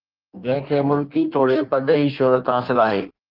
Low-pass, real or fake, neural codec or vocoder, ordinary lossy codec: 5.4 kHz; fake; codec, 16 kHz in and 24 kHz out, 1.1 kbps, FireRedTTS-2 codec; Opus, 24 kbps